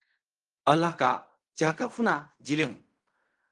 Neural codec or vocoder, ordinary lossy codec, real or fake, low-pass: codec, 16 kHz in and 24 kHz out, 0.4 kbps, LongCat-Audio-Codec, fine tuned four codebook decoder; Opus, 24 kbps; fake; 10.8 kHz